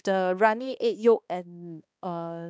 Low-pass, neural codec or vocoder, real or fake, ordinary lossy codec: none; codec, 16 kHz, 2 kbps, X-Codec, HuBERT features, trained on balanced general audio; fake; none